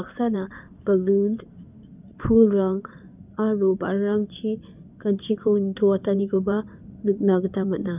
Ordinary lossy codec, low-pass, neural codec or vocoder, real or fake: none; 3.6 kHz; codec, 16 kHz in and 24 kHz out, 2.2 kbps, FireRedTTS-2 codec; fake